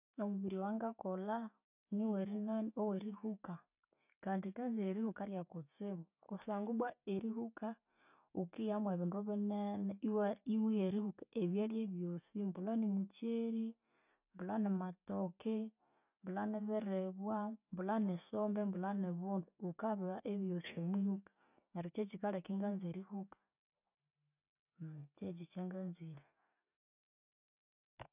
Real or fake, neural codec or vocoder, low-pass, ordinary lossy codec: real; none; 3.6 kHz; none